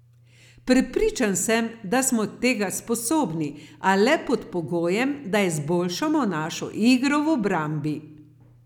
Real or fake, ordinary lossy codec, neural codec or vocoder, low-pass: real; none; none; 19.8 kHz